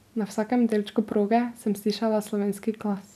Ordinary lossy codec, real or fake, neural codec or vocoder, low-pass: none; real; none; 14.4 kHz